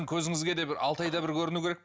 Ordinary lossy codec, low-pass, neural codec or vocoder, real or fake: none; none; none; real